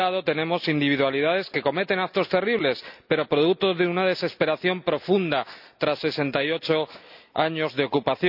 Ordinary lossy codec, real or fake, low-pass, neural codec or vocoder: none; real; 5.4 kHz; none